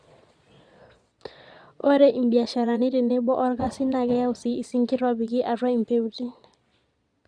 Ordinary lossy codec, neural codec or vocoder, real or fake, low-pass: Opus, 64 kbps; vocoder, 22.05 kHz, 80 mel bands, Vocos; fake; 9.9 kHz